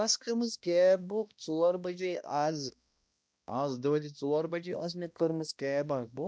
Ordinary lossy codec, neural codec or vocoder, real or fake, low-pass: none; codec, 16 kHz, 1 kbps, X-Codec, HuBERT features, trained on balanced general audio; fake; none